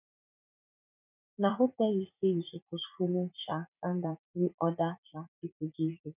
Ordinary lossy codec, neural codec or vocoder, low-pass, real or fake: none; vocoder, 44.1 kHz, 80 mel bands, Vocos; 3.6 kHz; fake